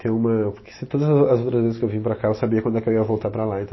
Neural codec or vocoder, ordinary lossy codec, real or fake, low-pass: none; MP3, 24 kbps; real; 7.2 kHz